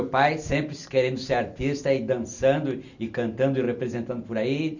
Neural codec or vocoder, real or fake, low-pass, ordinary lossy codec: none; real; 7.2 kHz; none